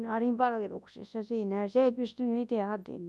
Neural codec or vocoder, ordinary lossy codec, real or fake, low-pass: codec, 24 kHz, 0.9 kbps, WavTokenizer, large speech release; none; fake; none